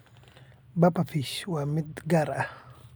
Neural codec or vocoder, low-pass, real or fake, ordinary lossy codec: none; none; real; none